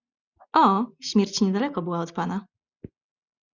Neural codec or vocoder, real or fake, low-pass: none; real; 7.2 kHz